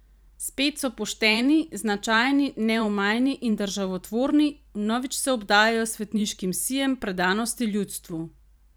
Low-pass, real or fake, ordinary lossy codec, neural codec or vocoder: none; fake; none; vocoder, 44.1 kHz, 128 mel bands every 512 samples, BigVGAN v2